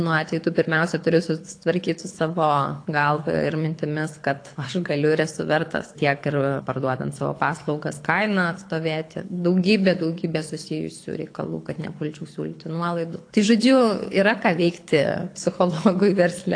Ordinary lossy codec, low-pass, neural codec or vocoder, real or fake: AAC, 48 kbps; 9.9 kHz; codec, 24 kHz, 6 kbps, HILCodec; fake